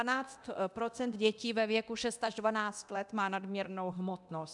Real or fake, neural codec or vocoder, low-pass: fake; codec, 24 kHz, 0.9 kbps, DualCodec; 10.8 kHz